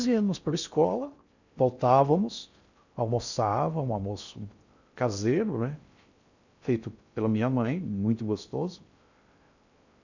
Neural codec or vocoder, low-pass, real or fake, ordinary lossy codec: codec, 16 kHz in and 24 kHz out, 0.6 kbps, FocalCodec, streaming, 4096 codes; 7.2 kHz; fake; none